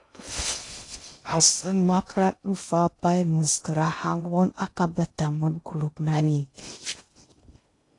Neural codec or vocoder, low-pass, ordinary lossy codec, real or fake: codec, 16 kHz in and 24 kHz out, 0.6 kbps, FocalCodec, streaming, 2048 codes; 10.8 kHz; AAC, 48 kbps; fake